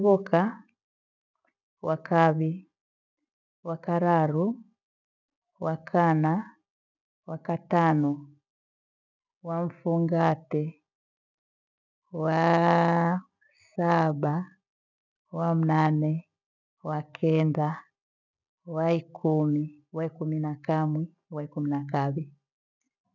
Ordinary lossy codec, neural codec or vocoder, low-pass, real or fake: none; none; 7.2 kHz; real